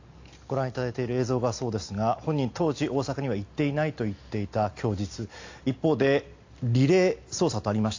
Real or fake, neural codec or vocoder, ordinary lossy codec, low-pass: real; none; AAC, 48 kbps; 7.2 kHz